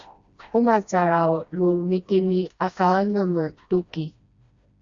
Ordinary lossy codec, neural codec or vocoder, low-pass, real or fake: Opus, 64 kbps; codec, 16 kHz, 1 kbps, FreqCodec, smaller model; 7.2 kHz; fake